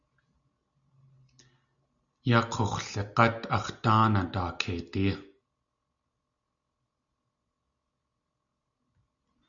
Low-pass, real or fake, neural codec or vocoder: 7.2 kHz; real; none